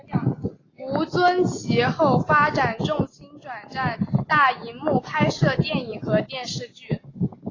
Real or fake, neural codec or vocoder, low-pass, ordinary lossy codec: real; none; 7.2 kHz; AAC, 32 kbps